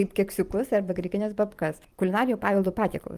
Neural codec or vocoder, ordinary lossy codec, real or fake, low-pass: vocoder, 44.1 kHz, 128 mel bands every 512 samples, BigVGAN v2; Opus, 32 kbps; fake; 14.4 kHz